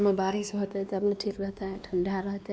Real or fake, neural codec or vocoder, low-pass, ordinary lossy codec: fake; codec, 16 kHz, 2 kbps, X-Codec, WavLM features, trained on Multilingual LibriSpeech; none; none